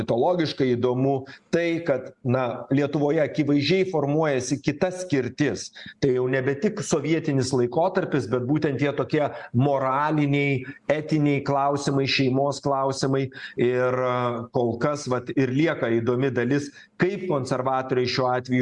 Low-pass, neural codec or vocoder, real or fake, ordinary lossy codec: 10.8 kHz; none; real; Opus, 64 kbps